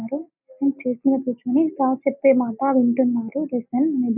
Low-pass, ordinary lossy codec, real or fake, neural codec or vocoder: 3.6 kHz; none; real; none